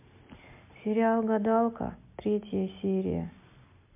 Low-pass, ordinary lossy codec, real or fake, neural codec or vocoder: 3.6 kHz; MP3, 24 kbps; real; none